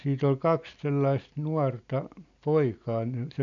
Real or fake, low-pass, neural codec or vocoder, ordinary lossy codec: real; 7.2 kHz; none; none